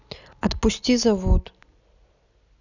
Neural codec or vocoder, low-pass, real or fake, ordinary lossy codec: none; 7.2 kHz; real; none